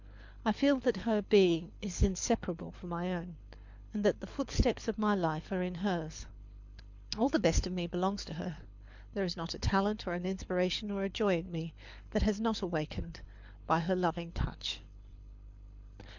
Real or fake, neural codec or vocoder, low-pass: fake; codec, 24 kHz, 6 kbps, HILCodec; 7.2 kHz